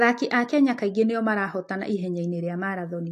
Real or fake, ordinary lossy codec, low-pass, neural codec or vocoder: real; AAC, 64 kbps; 14.4 kHz; none